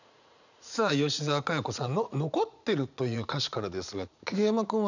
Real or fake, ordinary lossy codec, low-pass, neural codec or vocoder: fake; none; 7.2 kHz; vocoder, 44.1 kHz, 80 mel bands, Vocos